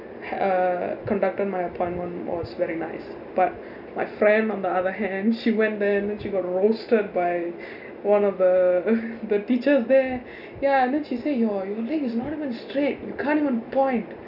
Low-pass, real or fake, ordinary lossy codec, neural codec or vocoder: 5.4 kHz; real; none; none